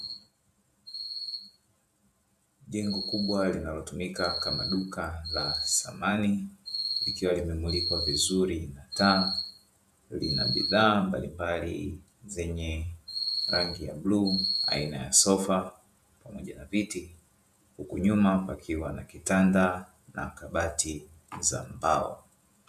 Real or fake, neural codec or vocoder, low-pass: fake; vocoder, 48 kHz, 128 mel bands, Vocos; 14.4 kHz